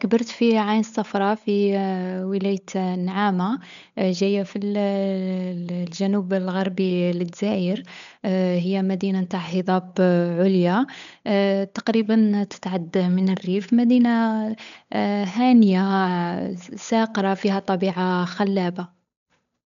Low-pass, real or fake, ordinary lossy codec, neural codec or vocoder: 7.2 kHz; fake; MP3, 96 kbps; codec, 16 kHz, 8 kbps, FunCodec, trained on Chinese and English, 25 frames a second